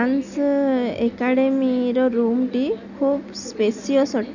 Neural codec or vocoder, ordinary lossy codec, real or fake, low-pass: none; none; real; 7.2 kHz